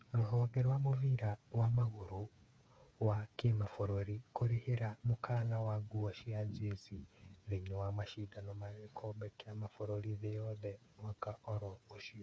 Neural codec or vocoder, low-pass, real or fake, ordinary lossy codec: codec, 16 kHz, 8 kbps, FreqCodec, smaller model; none; fake; none